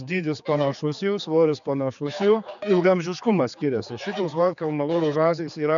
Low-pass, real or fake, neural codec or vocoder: 7.2 kHz; fake; codec, 16 kHz, 4 kbps, X-Codec, HuBERT features, trained on general audio